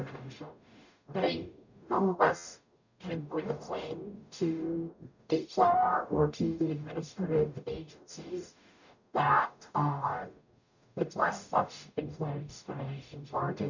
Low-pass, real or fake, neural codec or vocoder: 7.2 kHz; fake; codec, 44.1 kHz, 0.9 kbps, DAC